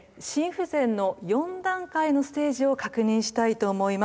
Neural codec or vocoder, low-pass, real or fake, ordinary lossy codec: none; none; real; none